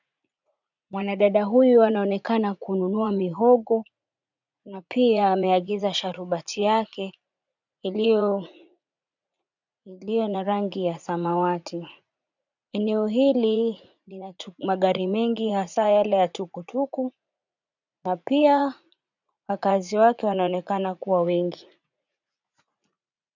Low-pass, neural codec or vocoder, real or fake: 7.2 kHz; vocoder, 44.1 kHz, 80 mel bands, Vocos; fake